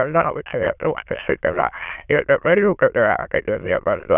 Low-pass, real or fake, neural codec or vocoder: 3.6 kHz; fake; autoencoder, 22.05 kHz, a latent of 192 numbers a frame, VITS, trained on many speakers